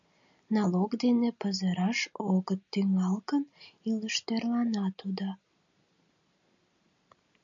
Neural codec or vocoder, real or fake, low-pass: none; real; 7.2 kHz